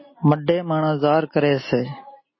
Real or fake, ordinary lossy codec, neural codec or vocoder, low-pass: real; MP3, 24 kbps; none; 7.2 kHz